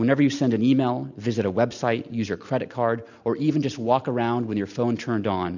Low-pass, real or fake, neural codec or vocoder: 7.2 kHz; real; none